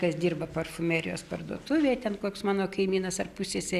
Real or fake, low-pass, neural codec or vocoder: real; 14.4 kHz; none